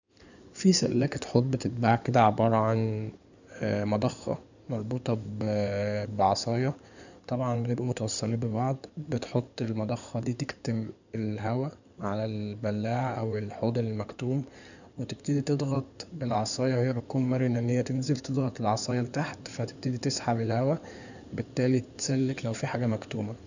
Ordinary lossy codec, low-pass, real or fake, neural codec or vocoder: none; 7.2 kHz; fake; codec, 16 kHz in and 24 kHz out, 2.2 kbps, FireRedTTS-2 codec